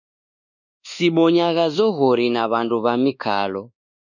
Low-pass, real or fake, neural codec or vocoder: 7.2 kHz; fake; codec, 24 kHz, 1.2 kbps, DualCodec